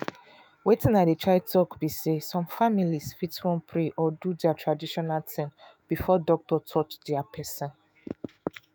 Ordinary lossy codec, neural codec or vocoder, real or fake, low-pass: none; autoencoder, 48 kHz, 128 numbers a frame, DAC-VAE, trained on Japanese speech; fake; none